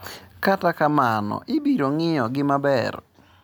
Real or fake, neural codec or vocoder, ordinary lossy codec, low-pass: real; none; none; none